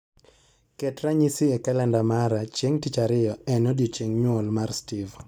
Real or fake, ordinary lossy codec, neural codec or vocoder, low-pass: real; none; none; none